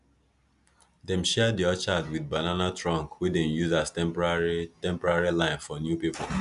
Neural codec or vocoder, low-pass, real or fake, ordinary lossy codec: none; 10.8 kHz; real; AAC, 96 kbps